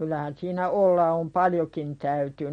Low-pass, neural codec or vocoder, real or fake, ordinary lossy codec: 9.9 kHz; none; real; MP3, 48 kbps